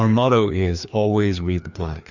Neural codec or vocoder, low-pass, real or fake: codec, 16 kHz, 2 kbps, FreqCodec, larger model; 7.2 kHz; fake